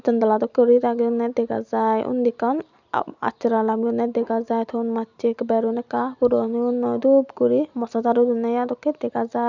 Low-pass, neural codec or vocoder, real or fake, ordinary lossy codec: 7.2 kHz; none; real; none